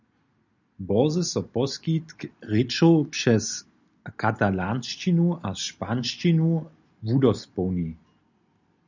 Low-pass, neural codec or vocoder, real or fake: 7.2 kHz; none; real